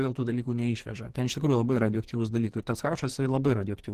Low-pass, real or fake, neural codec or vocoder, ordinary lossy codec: 14.4 kHz; fake; codec, 44.1 kHz, 2.6 kbps, SNAC; Opus, 16 kbps